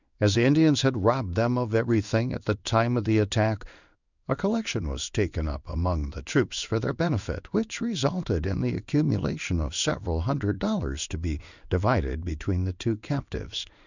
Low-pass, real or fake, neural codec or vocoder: 7.2 kHz; fake; codec, 16 kHz in and 24 kHz out, 1 kbps, XY-Tokenizer